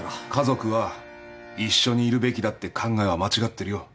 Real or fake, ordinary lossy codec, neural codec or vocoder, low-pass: real; none; none; none